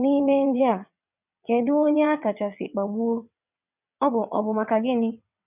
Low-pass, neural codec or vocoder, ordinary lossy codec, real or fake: 3.6 kHz; vocoder, 22.05 kHz, 80 mel bands, WaveNeXt; none; fake